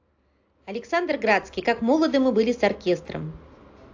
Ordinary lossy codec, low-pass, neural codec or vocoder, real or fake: AAC, 48 kbps; 7.2 kHz; none; real